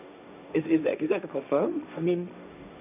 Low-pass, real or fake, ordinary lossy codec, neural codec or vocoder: 3.6 kHz; fake; none; codec, 16 kHz, 1.1 kbps, Voila-Tokenizer